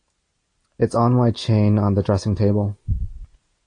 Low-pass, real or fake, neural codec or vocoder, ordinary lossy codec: 9.9 kHz; real; none; AAC, 48 kbps